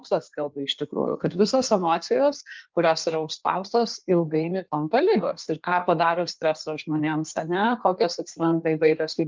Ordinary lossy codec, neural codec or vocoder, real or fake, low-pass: Opus, 24 kbps; codec, 16 kHz in and 24 kHz out, 1.1 kbps, FireRedTTS-2 codec; fake; 7.2 kHz